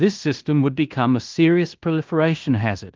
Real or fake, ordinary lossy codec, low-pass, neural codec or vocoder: fake; Opus, 32 kbps; 7.2 kHz; codec, 16 kHz in and 24 kHz out, 0.9 kbps, LongCat-Audio-Codec, fine tuned four codebook decoder